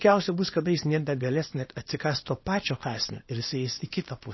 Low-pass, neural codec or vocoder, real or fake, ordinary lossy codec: 7.2 kHz; codec, 24 kHz, 0.9 kbps, WavTokenizer, small release; fake; MP3, 24 kbps